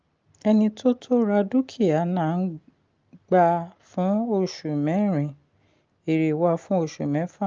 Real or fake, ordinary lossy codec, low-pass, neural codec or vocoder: real; Opus, 24 kbps; 7.2 kHz; none